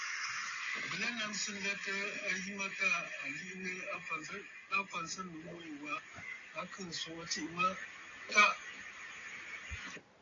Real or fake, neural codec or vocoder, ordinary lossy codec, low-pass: real; none; AAC, 32 kbps; 7.2 kHz